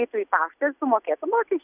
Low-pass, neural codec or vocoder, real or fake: 3.6 kHz; none; real